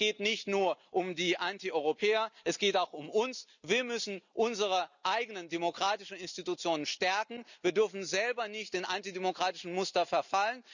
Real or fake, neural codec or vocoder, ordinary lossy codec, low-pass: real; none; none; 7.2 kHz